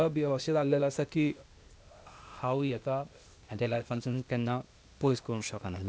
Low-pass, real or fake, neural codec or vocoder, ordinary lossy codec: none; fake; codec, 16 kHz, 0.8 kbps, ZipCodec; none